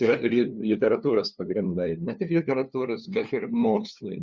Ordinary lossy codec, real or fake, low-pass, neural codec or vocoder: Opus, 64 kbps; fake; 7.2 kHz; codec, 16 kHz, 2 kbps, FunCodec, trained on LibriTTS, 25 frames a second